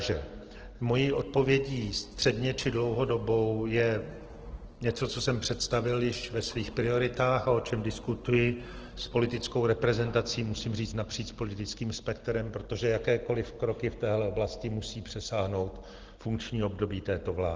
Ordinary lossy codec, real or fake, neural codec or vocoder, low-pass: Opus, 16 kbps; real; none; 7.2 kHz